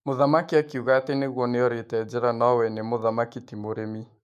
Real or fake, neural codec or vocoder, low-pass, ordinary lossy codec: fake; autoencoder, 48 kHz, 128 numbers a frame, DAC-VAE, trained on Japanese speech; 14.4 kHz; MP3, 64 kbps